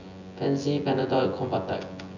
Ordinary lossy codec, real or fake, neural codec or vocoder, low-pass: none; fake; vocoder, 24 kHz, 100 mel bands, Vocos; 7.2 kHz